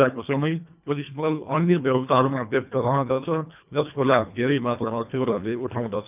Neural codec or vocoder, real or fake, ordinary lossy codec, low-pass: codec, 24 kHz, 1.5 kbps, HILCodec; fake; none; 3.6 kHz